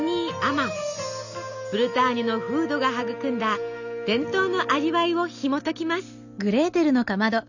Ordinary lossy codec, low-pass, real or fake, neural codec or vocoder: none; 7.2 kHz; real; none